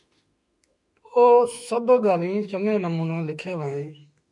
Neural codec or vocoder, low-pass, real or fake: autoencoder, 48 kHz, 32 numbers a frame, DAC-VAE, trained on Japanese speech; 10.8 kHz; fake